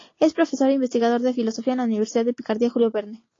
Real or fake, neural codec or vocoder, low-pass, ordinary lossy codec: real; none; 7.2 kHz; AAC, 32 kbps